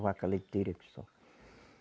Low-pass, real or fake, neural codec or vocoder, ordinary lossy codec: none; real; none; none